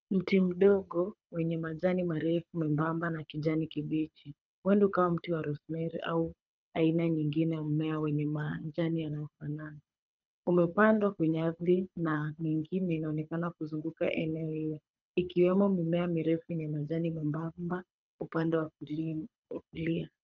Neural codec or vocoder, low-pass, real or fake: codec, 24 kHz, 6 kbps, HILCodec; 7.2 kHz; fake